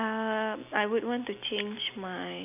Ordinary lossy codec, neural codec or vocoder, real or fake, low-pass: AAC, 32 kbps; none; real; 3.6 kHz